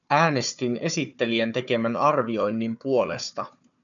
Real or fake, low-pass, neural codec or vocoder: fake; 7.2 kHz; codec, 16 kHz, 4 kbps, FunCodec, trained on Chinese and English, 50 frames a second